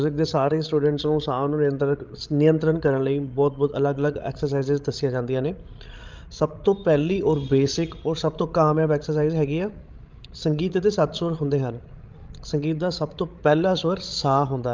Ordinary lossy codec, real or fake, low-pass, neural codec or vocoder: Opus, 32 kbps; fake; 7.2 kHz; codec, 16 kHz, 16 kbps, FreqCodec, larger model